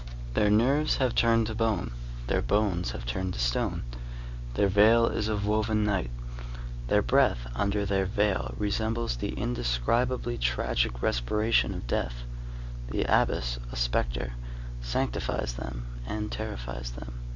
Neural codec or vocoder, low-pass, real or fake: none; 7.2 kHz; real